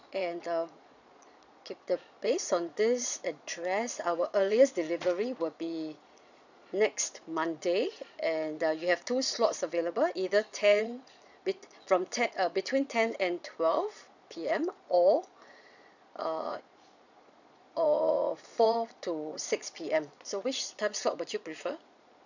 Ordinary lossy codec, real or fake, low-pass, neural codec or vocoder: none; fake; 7.2 kHz; vocoder, 22.05 kHz, 80 mel bands, Vocos